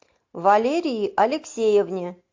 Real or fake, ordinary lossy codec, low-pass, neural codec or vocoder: real; MP3, 48 kbps; 7.2 kHz; none